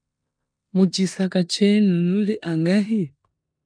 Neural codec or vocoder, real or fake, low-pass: codec, 16 kHz in and 24 kHz out, 0.9 kbps, LongCat-Audio-Codec, four codebook decoder; fake; 9.9 kHz